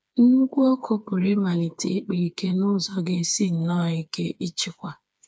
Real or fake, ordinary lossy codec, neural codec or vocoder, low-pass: fake; none; codec, 16 kHz, 4 kbps, FreqCodec, smaller model; none